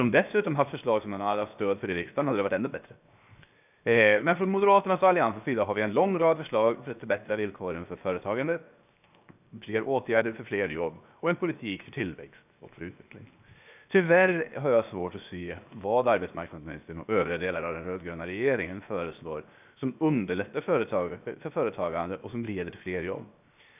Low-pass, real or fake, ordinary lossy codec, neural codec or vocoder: 3.6 kHz; fake; none; codec, 16 kHz, 0.7 kbps, FocalCodec